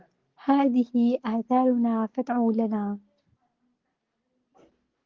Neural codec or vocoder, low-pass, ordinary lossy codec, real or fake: none; 7.2 kHz; Opus, 16 kbps; real